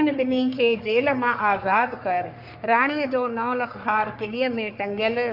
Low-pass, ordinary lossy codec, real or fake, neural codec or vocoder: 5.4 kHz; MP3, 48 kbps; fake; codec, 44.1 kHz, 3.4 kbps, Pupu-Codec